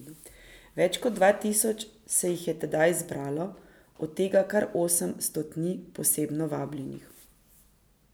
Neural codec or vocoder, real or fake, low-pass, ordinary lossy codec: none; real; none; none